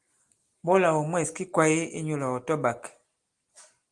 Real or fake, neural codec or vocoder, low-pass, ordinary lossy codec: real; none; 10.8 kHz; Opus, 24 kbps